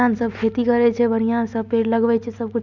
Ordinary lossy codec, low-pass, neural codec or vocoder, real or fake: none; 7.2 kHz; none; real